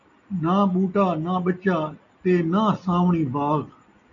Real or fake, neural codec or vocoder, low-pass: real; none; 7.2 kHz